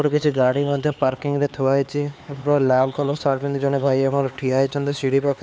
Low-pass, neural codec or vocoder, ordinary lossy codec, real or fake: none; codec, 16 kHz, 4 kbps, X-Codec, HuBERT features, trained on LibriSpeech; none; fake